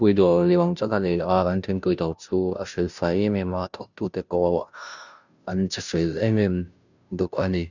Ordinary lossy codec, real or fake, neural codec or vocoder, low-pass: none; fake; codec, 16 kHz, 0.5 kbps, FunCodec, trained on Chinese and English, 25 frames a second; 7.2 kHz